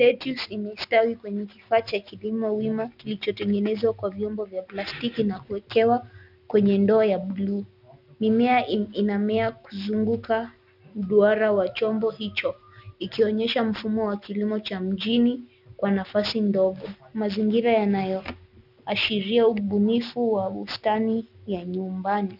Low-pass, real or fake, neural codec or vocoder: 5.4 kHz; real; none